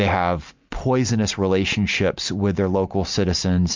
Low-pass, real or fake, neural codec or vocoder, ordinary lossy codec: 7.2 kHz; real; none; MP3, 64 kbps